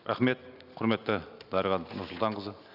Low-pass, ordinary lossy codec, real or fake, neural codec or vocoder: 5.4 kHz; none; real; none